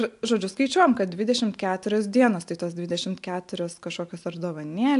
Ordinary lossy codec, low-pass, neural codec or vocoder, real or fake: AAC, 64 kbps; 10.8 kHz; none; real